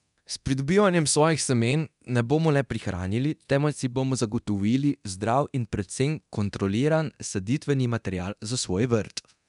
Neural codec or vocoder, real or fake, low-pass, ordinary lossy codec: codec, 24 kHz, 0.9 kbps, DualCodec; fake; 10.8 kHz; none